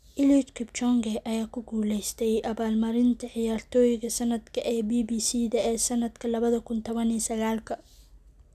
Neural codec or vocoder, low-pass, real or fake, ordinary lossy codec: none; 14.4 kHz; real; none